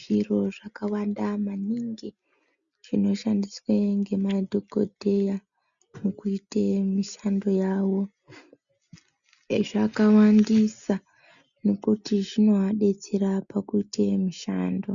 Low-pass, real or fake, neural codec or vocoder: 7.2 kHz; real; none